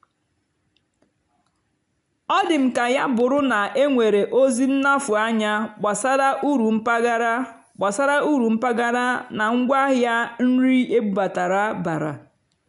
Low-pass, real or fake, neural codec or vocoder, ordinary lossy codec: 10.8 kHz; real; none; none